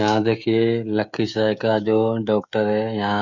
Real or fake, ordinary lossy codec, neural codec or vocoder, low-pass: fake; none; codec, 16 kHz, 16 kbps, FreqCodec, smaller model; 7.2 kHz